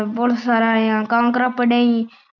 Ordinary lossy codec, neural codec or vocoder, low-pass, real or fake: none; none; 7.2 kHz; real